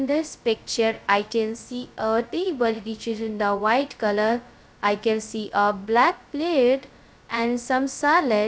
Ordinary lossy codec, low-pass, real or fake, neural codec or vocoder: none; none; fake; codec, 16 kHz, 0.2 kbps, FocalCodec